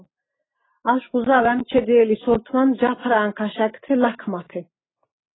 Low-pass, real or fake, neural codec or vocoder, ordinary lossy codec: 7.2 kHz; real; none; AAC, 16 kbps